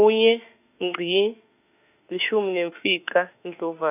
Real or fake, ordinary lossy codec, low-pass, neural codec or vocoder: fake; none; 3.6 kHz; autoencoder, 48 kHz, 32 numbers a frame, DAC-VAE, trained on Japanese speech